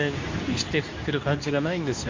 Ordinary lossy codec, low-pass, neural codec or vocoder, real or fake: MP3, 48 kbps; 7.2 kHz; codec, 24 kHz, 0.9 kbps, WavTokenizer, medium speech release version 2; fake